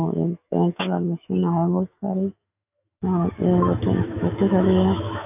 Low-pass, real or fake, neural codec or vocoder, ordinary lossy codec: 3.6 kHz; fake; autoencoder, 48 kHz, 128 numbers a frame, DAC-VAE, trained on Japanese speech; none